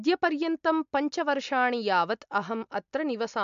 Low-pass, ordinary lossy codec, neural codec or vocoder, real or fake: 7.2 kHz; MP3, 64 kbps; none; real